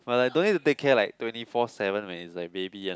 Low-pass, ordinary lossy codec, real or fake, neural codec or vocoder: none; none; real; none